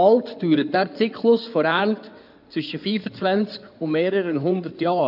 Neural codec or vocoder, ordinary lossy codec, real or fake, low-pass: codec, 16 kHz in and 24 kHz out, 2.2 kbps, FireRedTTS-2 codec; none; fake; 5.4 kHz